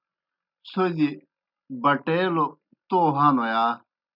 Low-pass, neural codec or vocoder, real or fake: 5.4 kHz; none; real